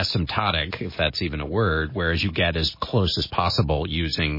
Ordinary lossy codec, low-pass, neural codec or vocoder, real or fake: MP3, 24 kbps; 5.4 kHz; none; real